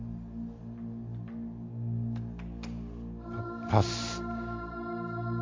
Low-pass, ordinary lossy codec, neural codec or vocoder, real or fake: 7.2 kHz; MP3, 48 kbps; none; real